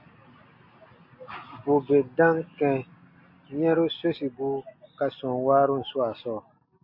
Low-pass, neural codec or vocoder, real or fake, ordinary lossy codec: 5.4 kHz; none; real; MP3, 32 kbps